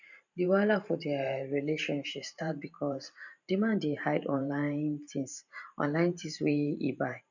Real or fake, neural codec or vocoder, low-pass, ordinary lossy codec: fake; vocoder, 44.1 kHz, 128 mel bands every 512 samples, BigVGAN v2; 7.2 kHz; none